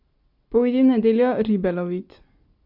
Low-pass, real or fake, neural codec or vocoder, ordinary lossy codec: 5.4 kHz; real; none; Opus, 64 kbps